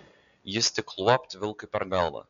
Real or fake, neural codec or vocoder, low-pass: real; none; 7.2 kHz